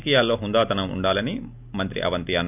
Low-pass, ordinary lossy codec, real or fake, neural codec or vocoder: 3.6 kHz; none; real; none